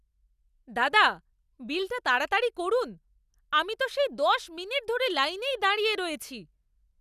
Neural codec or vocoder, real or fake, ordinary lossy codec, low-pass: none; real; none; 14.4 kHz